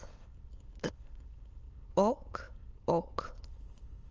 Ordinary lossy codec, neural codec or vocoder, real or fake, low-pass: Opus, 24 kbps; autoencoder, 22.05 kHz, a latent of 192 numbers a frame, VITS, trained on many speakers; fake; 7.2 kHz